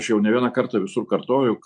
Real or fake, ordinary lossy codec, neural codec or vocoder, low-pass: real; MP3, 96 kbps; none; 9.9 kHz